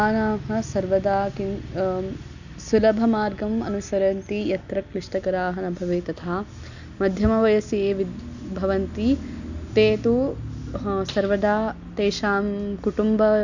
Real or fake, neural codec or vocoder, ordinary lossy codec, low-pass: real; none; none; 7.2 kHz